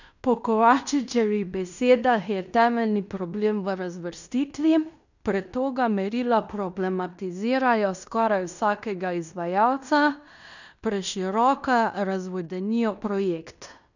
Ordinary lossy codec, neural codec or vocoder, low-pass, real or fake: none; codec, 16 kHz in and 24 kHz out, 0.9 kbps, LongCat-Audio-Codec, fine tuned four codebook decoder; 7.2 kHz; fake